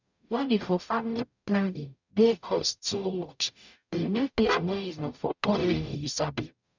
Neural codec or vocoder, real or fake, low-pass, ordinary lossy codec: codec, 44.1 kHz, 0.9 kbps, DAC; fake; 7.2 kHz; none